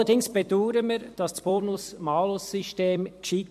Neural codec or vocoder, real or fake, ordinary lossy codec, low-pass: vocoder, 44.1 kHz, 128 mel bands every 256 samples, BigVGAN v2; fake; MP3, 64 kbps; 14.4 kHz